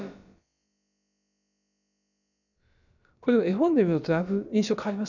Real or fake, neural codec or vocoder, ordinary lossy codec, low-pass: fake; codec, 16 kHz, about 1 kbps, DyCAST, with the encoder's durations; none; 7.2 kHz